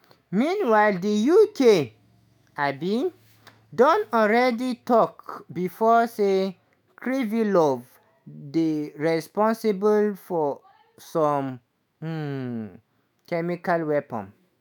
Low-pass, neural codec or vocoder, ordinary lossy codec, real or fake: none; autoencoder, 48 kHz, 128 numbers a frame, DAC-VAE, trained on Japanese speech; none; fake